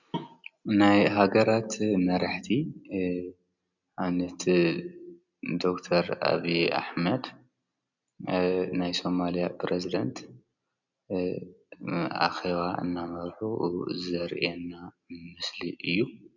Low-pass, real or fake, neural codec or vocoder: 7.2 kHz; real; none